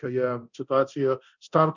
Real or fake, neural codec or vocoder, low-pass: fake; codec, 24 kHz, 0.9 kbps, DualCodec; 7.2 kHz